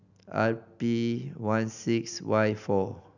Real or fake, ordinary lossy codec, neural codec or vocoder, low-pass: real; none; none; 7.2 kHz